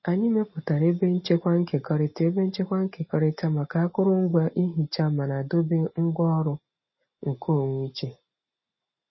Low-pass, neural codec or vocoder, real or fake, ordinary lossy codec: 7.2 kHz; none; real; MP3, 24 kbps